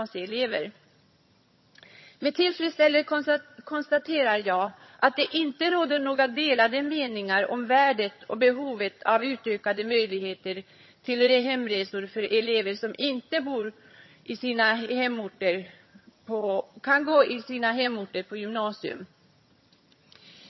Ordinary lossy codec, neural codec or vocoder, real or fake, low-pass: MP3, 24 kbps; vocoder, 22.05 kHz, 80 mel bands, HiFi-GAN; fake; 7.2 kHz